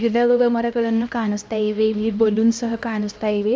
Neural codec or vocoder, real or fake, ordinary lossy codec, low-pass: codec, 16 kHz, 1 kbps, X-Codec, HuBERT features, trained on LibriSpeech; fake; none; none